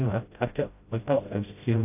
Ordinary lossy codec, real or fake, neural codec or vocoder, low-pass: AAC, 32 kbps; fake; codec, 16 kHz, 0.5 kbps, FreqCodec, smaller model; 3.6 kHz